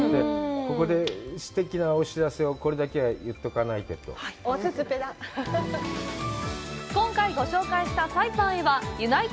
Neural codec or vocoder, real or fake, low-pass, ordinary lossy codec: none; real; none; none